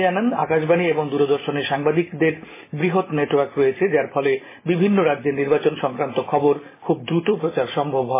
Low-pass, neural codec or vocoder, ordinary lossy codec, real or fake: 3.6 kHz; none; MP3, 16 kbps; real